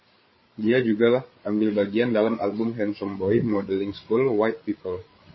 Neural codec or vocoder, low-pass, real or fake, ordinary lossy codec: codec, 16 kHz, 8 kbps, FreqCodec, larger model; 7.2 kHz; fake; MP3, 24 kbps